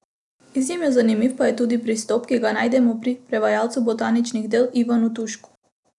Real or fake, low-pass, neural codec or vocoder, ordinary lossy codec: real; 10.8 kHz; none; none